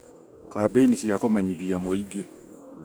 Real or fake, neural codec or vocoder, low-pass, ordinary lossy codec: fake; codec, 44.1 kHz, 2.6 kbps, DAC; none; none